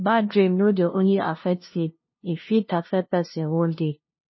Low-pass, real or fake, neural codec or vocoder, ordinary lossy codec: 7.2 kHz; fake; codec, 16 kHz, 0.5 kbps, FunCodec, trained on LibriTTS, 25 frames a second; MP3, 24 kbps